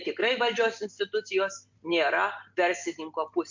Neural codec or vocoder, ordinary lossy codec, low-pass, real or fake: none; MP3, 64 kbps; 7.2 kHz; real